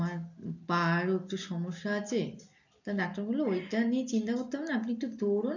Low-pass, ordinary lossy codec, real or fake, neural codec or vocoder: 7.2 kHz; none; real; none